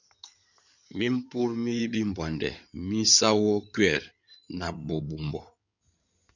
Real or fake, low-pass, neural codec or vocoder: fake; 7.2 kHz; codec, 16 kHz in and 24 kHz out, 2.2 kbps, FireRedTTS-2 codec